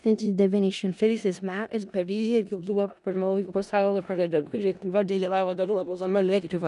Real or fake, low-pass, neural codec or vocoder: fake; 10.8 kHz; codec, 16 kHz in and 24 kHz out, 0.4 kbps, LongCat-Audio-Codec, four codebook decoder